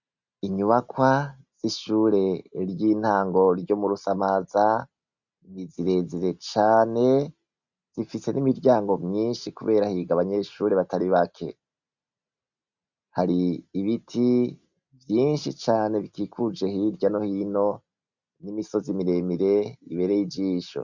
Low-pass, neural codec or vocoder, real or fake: 7.2 kHz; none; real